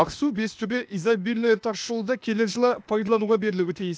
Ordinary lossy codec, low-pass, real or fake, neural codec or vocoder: none; none; fake; codec, 16 kHz, 0.8 kbps, ZipCodec